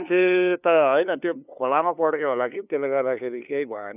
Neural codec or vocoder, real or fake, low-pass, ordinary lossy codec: codec, 16 kHz, 2 kbps, FunCodec, trained on LibriTTS, 25 frames a second; fake; 3.6 kHz; none